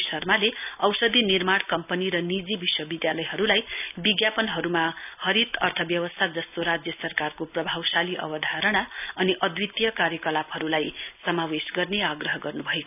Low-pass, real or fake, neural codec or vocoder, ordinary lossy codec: 3.6 kHz; real; none; none